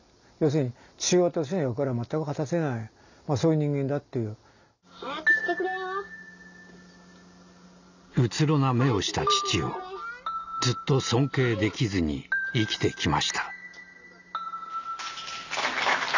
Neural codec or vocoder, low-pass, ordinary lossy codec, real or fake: none; 7.2 kHz; none; real